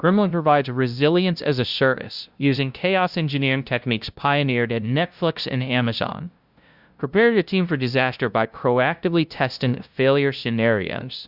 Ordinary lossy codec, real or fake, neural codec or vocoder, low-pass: Opus, 64 kbps; fake; codec, 16 kHz, 0.5 kbps, FunCodec, trained on LibriTTS, 25 frames a second; 5.4 kHz